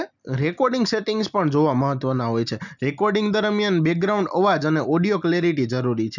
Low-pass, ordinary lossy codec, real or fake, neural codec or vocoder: 7.2 kHz; none; real; none